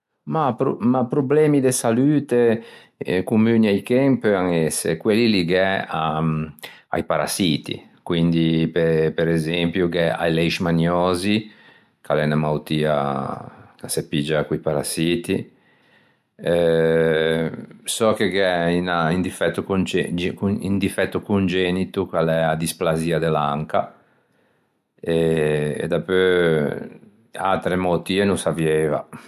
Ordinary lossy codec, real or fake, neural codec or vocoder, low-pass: MP3, 96 kbps; real; none; 14.4 kHz